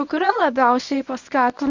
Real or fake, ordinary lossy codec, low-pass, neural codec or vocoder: fake; Opus, 64 kbps; 7.2 kHz; codec, 24 kHz, 0.9 kbps, WavTokenizer, medium speech release version 1